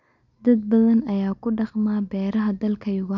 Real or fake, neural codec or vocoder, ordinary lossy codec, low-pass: real; none; none; 7.2 kHz